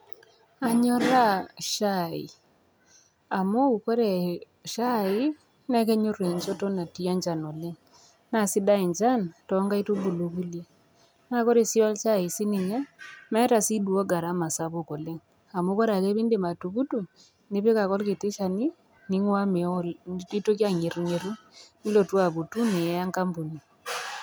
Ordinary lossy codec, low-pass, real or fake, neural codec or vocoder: none; none; real; none